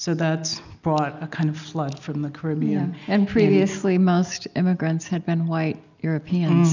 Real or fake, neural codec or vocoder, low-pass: real; none; 7.2 kHz